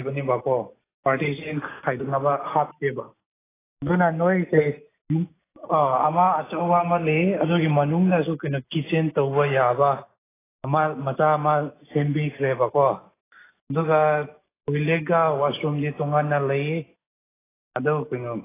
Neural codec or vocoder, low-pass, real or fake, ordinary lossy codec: none; 3.6 kHz; real; AAC, 16 kbps